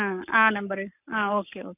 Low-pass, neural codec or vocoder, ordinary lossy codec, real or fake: 3.6 kHz; codec, 44.1 kHz, 7.8 kbps, Pupu-Codec; none; fake